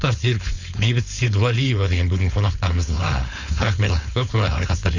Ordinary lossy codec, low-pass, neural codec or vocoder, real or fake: Opus, 64 kbps; 7.2 kHz; codec, 16 kHz, 4.8 kbps, FACodec; fake